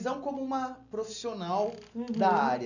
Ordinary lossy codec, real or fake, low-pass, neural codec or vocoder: none; real; 7.2 kHz; none